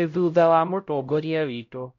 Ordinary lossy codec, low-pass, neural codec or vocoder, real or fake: MP3, 48 kbps; 7.2 kHz; codec, 16 kHz, 0.5 kbps, X-Codec, HuBERT features, trained on LibriSpeech; fake